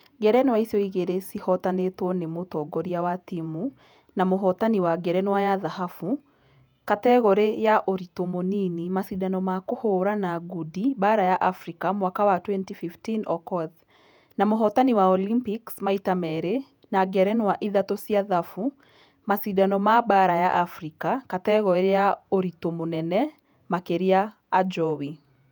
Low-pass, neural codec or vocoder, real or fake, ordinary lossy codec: 19.8 kHz; vocoder, 48 kHz, 128 mel bands, Vocos; fake; none